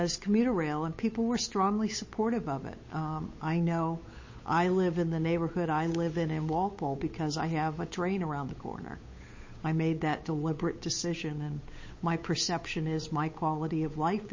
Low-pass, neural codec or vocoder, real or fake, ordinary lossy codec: 7.2 kHz; codec, 16 kHz, 8 kbps, FunCodec, trained on Chinese and English, 25 frames a second; fake; MP3, 32 kbps